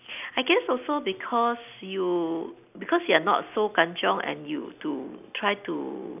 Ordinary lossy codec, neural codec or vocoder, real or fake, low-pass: none; none; real; 3.6 kHz